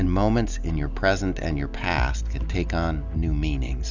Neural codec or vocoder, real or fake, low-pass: none; real; 7.2 kHz